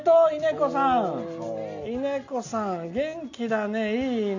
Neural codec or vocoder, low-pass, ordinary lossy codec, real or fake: none; 7.2 kHz; none; real